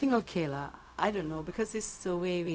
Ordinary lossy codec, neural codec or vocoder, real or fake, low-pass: none; codec, 16 kHz, 0.4 kbps, LongCat-Audio-Codec; fake; none